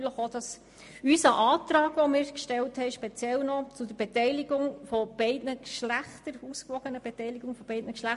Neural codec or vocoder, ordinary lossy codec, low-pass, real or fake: none; MP3, 48 kbps; 14.4 kHz; real